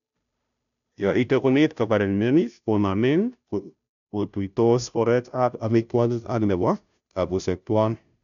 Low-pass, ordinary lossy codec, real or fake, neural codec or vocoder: 7.2 kHz; none; fake; codec, 16 kHz, 0.5 kbps, FunCodec, trained on Chinese and English, 25 frames a second